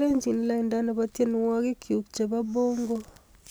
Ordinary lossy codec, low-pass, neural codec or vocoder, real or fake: none; none; none; real